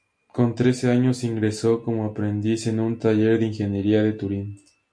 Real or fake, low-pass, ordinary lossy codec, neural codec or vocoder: real; 9.9 kHz; MP3, 64 kbps; none